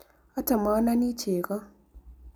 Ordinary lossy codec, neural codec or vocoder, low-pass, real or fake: none; none; none; real